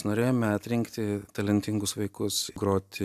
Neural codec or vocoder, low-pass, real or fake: none; 14.4 kHz; real